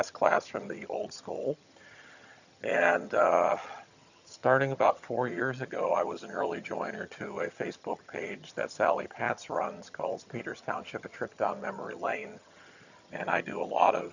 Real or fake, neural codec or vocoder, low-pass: fake; vocoder, 22.05 kHz, 80 mel bands, HiFi-GAN; 7.2 kHz